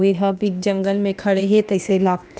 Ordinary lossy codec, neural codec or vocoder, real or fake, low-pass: none; codec, 16 kHz, 0.8 kbps, ZipCodec; fake; none